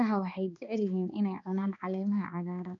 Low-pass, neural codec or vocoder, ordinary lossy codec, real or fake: 7.2 kHz; codec, 16 kHz, 2 kbps, X-Codec, HuBERT features, trained on balanced general audio; none; fake